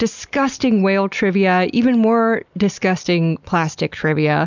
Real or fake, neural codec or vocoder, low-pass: real; none; 7.2 kHz